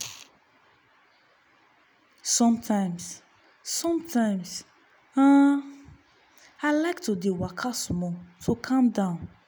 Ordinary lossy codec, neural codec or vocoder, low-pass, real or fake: none; none; none; real